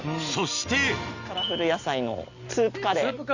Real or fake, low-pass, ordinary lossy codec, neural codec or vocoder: real; 7.2 kHz; Opus, 64 kbps; none